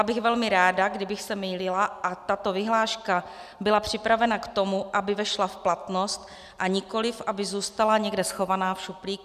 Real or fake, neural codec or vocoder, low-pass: real; none; 14.4 kHz